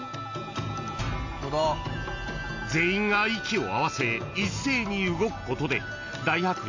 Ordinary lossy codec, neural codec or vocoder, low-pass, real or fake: AAC, 48 kbps; none; 7.2 kHz; real